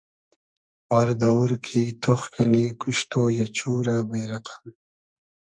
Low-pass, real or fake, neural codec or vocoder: 9.9 kHz; fake; codec, 44.1 kHz, 2.6 kbps, SNAC